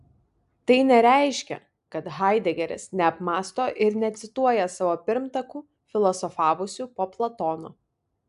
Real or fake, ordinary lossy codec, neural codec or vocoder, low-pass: real; AAC, 96 kbps; none; 10.8 kHz